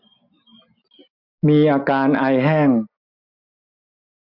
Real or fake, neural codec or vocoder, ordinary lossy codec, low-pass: real; none; MP3, 48 kbps; 5.4 kHz